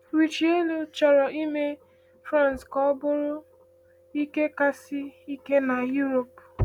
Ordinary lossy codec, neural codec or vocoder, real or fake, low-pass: none; none; real; 19.8 kHz